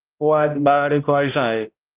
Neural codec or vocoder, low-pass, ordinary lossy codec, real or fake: codec, 16 kHz, 0.5 kbps, X-Codec, HuBERT features, trained on balanced general audio; 3.6 kHz; Opus, 24 kbps; fake